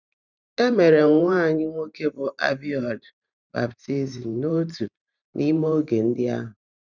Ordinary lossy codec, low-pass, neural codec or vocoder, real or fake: none; 7.2 kHz; vocoder, 44.1 kHz, 128 mel bands every 512 samples, BigVGAN v2; fake